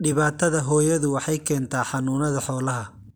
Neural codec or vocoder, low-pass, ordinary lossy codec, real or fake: none; none; none; real